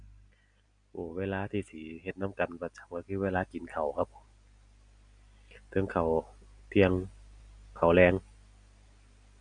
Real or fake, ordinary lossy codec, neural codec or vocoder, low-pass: real; none; none; 9.9 kHz